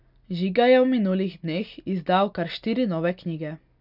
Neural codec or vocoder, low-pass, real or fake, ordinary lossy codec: none; 5.4 kHz; real; none